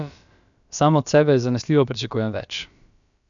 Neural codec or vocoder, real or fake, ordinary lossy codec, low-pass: codec, 16 kHz, about 1 kbps, DyCAST, with the encoder's durations; fake; none; 7.2 kHz